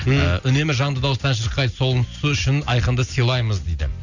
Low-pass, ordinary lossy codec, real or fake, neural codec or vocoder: 7.2 kHz; none; real; none